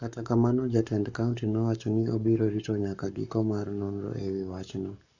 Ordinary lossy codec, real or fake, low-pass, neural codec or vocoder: none; fake; 7.2 kHz; codec, 44.1 kHz, 7.8 kbps, Pupu-Codec